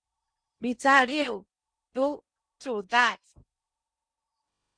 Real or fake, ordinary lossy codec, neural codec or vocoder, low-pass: fake; Opus, 64 kbps; codec, 16 kHz in and 24 kHz out, 0.6 kbps, FocalCodec, streaming, 2048 codes; 9.9 kHz